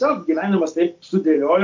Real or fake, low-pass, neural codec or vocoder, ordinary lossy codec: real; 7.2 kHz; none; MP3, 48 kbps